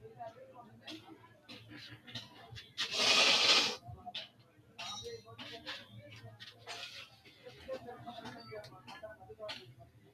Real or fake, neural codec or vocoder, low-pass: real; none; 14.4 kHz